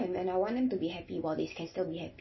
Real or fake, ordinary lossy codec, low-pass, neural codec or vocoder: real; MP3, 24 kbps; 7.2 kHz; none